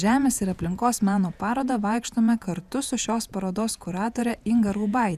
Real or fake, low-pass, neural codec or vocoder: fake; 14.4 kHz; vocoder, 48 kHz, 128 mel bands, Vocos